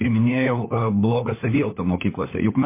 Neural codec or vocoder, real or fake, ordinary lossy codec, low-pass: codec, 16 kHz, 4 kbps, FunCodec, trained on LibriTTS, 50 frames a second; fake; MP3, 24 kbps; 3.6 kHz